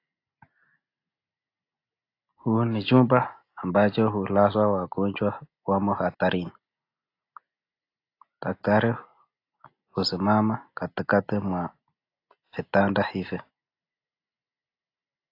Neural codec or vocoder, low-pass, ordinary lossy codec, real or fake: none; 5.4 kHz; AAC, 32 kbps; real